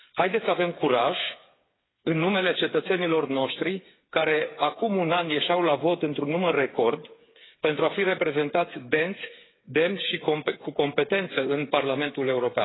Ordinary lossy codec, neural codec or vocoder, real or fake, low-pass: AAC, 16 kbps; codec, 16 kHz, 8 kbps, FreqCodec, smaller model; fake; 7.2 kHz